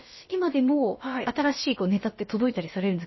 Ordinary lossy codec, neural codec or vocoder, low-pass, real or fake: MP3, 24 kbps; codec, 16 kHz, about 1 kbps, DyCAST, with the encoder's durations; 7.2 kHz; fake